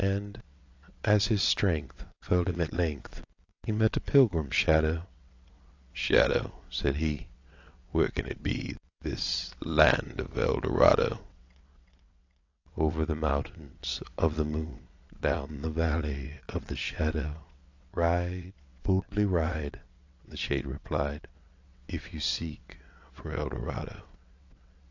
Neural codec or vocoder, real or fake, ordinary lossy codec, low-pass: vocoder, 22.05 kHz, 80 mel bands, WaveNeXt; fake; AAC, 48 kbps; 7.2 kHz